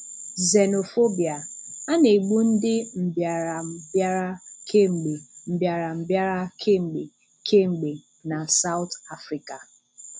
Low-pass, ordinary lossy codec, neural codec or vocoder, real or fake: none; none; none; real